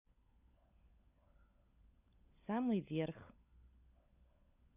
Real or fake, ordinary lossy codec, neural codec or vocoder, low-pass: fake; none; codec, 16 kHz, 16 kbps, FunCodec, trained on LibriTTS, 50 frames a second; 3.6 kHz